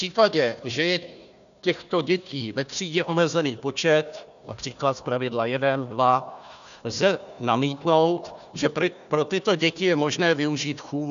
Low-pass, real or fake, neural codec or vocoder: 7.2 kHz; fake; codec, 16 kHz, 1 kbps, FunCodec, trained on Chinese and English, 50 frames a second